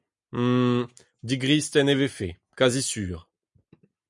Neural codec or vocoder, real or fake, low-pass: none; real; 10.8 kHz